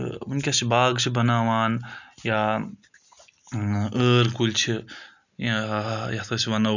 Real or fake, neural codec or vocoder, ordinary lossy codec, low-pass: real; none; none; 7.2 kHz